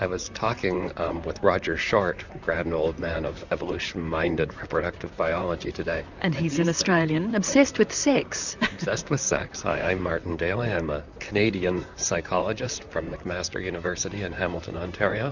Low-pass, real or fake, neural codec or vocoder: 7.2 kHz; fake; vocoder, 44.1 kHz, 128 mel bands, Pupu-Vocoder